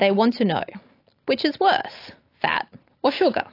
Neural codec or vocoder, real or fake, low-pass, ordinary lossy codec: none; real; 5.4 kHz; AAC, 32 kbps